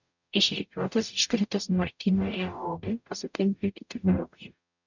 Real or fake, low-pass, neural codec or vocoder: fake; 7.2 kHz; codec, 44.1 kHz, 0.9 kbps, DAC